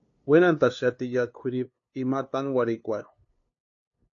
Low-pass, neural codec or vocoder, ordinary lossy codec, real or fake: 7.2 kHz; codec, 16 kHz, 2 kbps, FunCodec, trained on LibriTTS, 25 frames a second; AAC, 48 kbps; fake